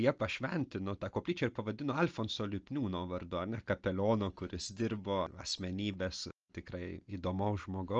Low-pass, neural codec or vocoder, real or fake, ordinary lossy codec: 7.2 kHz; none; real; Opus, 24 kbps